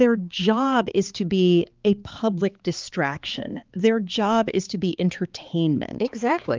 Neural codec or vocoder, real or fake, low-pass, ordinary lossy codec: codec, 16 kHz, 4 kbps, X-Codec, HuBERT features, trained on balanced general audio; fake; 7.2 kHz; Opus, 32 kbps